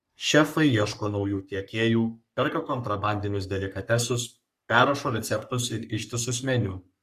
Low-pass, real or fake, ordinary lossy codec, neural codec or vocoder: 14.4 kHz; fake; Opus, 64 kbps; codec, 44.1 kHz, 3.4 kbps, Pupu-Codec